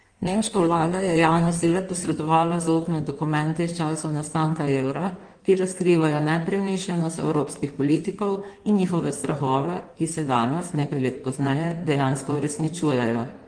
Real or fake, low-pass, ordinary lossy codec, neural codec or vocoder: fake; 9.9 kHz; Opus, 24 kbps; codec, 16 kHz in and 24 kHz out, 1.1 kbps, FireRedTTS-2 codec